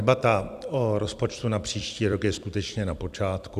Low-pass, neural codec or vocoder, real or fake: 14.4 kHz; vocoder, 44.1 kHz, 128 mel bands every 256 samples, BigVGAN v2; fake